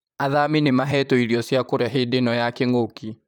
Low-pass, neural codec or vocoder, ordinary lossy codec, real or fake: 19.8 kHz; vocoder, 44.1 kHz, 128 mel bands, Pupu-Vocoder; none; fake